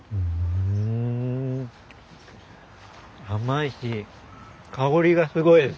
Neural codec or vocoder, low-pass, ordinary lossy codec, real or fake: none; none; none; real